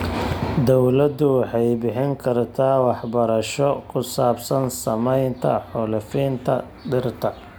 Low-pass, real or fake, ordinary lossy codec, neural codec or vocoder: none; real; none; none